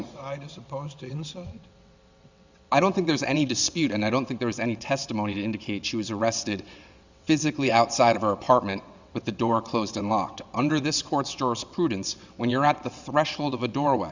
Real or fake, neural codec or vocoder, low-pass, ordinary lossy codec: fake; vocoder, 22.05 kHz, 80 mel bands, WaveNeXt; 7.2 kHz; Opus, 64 kbps